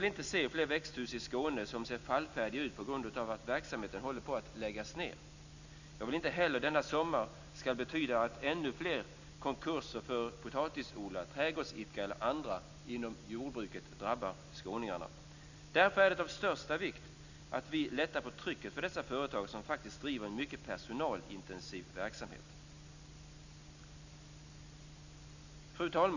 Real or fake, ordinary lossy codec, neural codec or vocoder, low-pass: real; none; none; 7.2 kHz